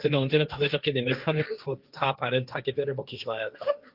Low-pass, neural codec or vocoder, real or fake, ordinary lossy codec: 5.4 kHz; codec, 16 kHz, 1.1 kbps, Voila-Tokenizer; fake; Opus, 24 kbps